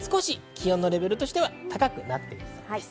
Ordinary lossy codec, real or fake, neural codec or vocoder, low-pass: none; real; none; none